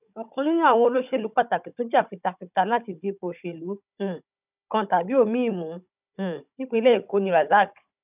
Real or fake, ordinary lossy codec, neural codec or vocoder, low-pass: fake; none; codec, 16 kHz, 16 kbps, FunCodec, trained on Chinese and English, 50 frames a second; 3.6 kHz